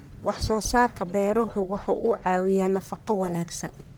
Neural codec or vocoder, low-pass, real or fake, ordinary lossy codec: codec, 44.1 kHz, 1.7 kbps, Pupu-Codec; none; fake; none